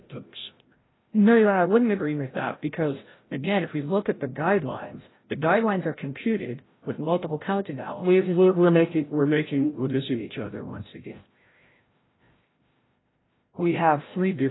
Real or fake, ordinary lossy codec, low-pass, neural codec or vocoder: fake; AAC, 16 kbps; 7.2 kHz; codec, 16 kHz, 0.5 kbps, FreqCodec, larger model